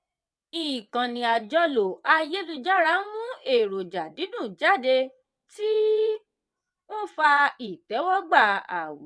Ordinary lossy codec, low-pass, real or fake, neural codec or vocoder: none; none; fake; vocoder, 22.05 kHz, 80 mel bands, WaveNeXt